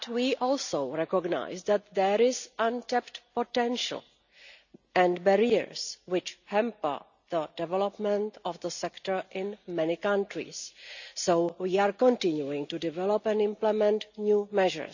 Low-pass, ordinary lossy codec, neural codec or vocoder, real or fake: 7.2 kHz; none; none; real